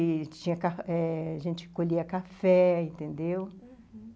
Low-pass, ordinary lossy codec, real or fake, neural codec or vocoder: none; none; real; none